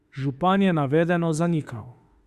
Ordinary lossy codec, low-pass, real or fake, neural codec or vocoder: Opus, 64 kbps; 14.4 kHz; fake; autoencoder, 48 kHz, 32 numbers a frame, DAC-VAE, trained on Japanese speech